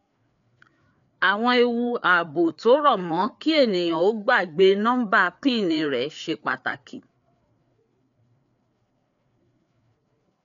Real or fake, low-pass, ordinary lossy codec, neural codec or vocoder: fake; 7.2 kHz; none; codec, 16 kHz, 4 kbps, FreqCodec, larger model